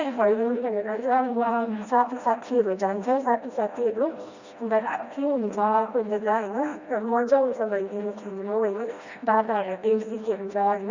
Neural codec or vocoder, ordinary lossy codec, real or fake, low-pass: codec, 16 kHz, 1 kbps, FreqCodec, smaller model; Opus, 64 kbps; fake; 7.2 kHz